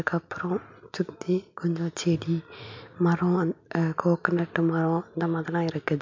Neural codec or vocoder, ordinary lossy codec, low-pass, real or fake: none; MP3, 64 kbps; 7.2 kHz; real